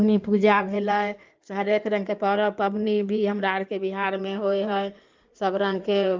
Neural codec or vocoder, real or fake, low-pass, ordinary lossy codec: codec, 16 kHz in and 24 kHz out, 2.2 kbps, FireRedTTS-2 codec; fake; 7.2 kHz; Opus, 32 kbps